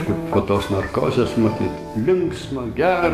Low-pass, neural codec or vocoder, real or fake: 14.4 kHz; codec, 44.1 kHz, 7.8 kbps, DAC; fake